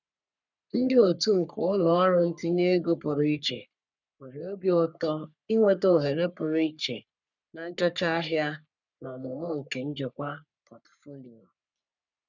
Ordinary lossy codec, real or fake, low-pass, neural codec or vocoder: none; fake; 7.2 kHz; codec, 44.1 kHz, 3.4 kbps, Pupu-Codec